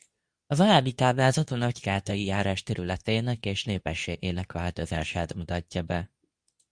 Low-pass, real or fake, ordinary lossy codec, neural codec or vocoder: 9.9 kHz; fake; Opus, 64 kbps; codec, 24 kHz, 0.9 kbps, WavTokenizer, medium speech release version 2